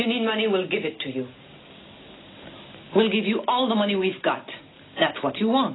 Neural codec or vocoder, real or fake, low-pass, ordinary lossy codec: none; real; 7.2 kHz; AAC, 16 kbps